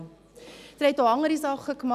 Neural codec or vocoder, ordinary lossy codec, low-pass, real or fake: none; none; 14.4 kHz; real